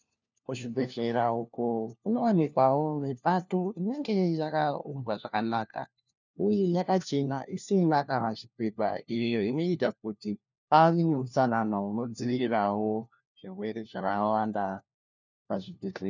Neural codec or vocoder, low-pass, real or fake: codec, 16 kHz, 1 kbps, FunCodec, trained on LibriTTS, 50 frames a second; 7.2 kHz; fake